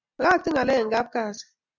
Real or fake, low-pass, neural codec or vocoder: real; 7.2 kHz; none